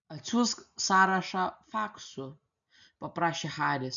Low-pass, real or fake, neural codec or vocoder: 7.2 kHz; real; none